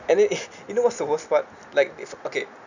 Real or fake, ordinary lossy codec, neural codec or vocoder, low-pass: real; none; none; 7.2 kHz